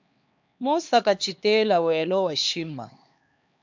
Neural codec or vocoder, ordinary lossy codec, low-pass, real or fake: codec, 16 kHz, 2 kbps, X-Codec, HuBERT features, trained on LibriSpeech; MP3, 64 kbps; 7.2 kHz; fake